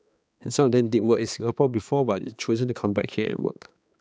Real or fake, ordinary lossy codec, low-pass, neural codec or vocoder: fake; none; none; codec, 16 kHz, 2 kbps, X-Codec, HuBERT features, trained on balanced general audio